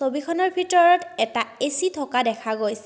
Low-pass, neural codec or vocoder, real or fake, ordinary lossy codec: none; none; real; none